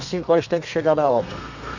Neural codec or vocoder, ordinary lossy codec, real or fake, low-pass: codec, 32 kHz, 1.9 kbps, SNAC; none; fake; 7.2 kHz